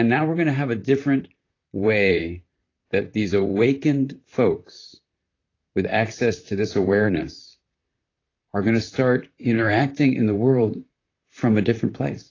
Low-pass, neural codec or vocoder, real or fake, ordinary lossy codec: 7.2 kHz; vocoder, 44.1 kHz, 128 mel bands, Pupu-Vocoder; fake; AAC, 32 kbps